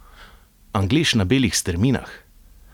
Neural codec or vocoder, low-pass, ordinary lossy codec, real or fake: none; 19.8 kHz; none; real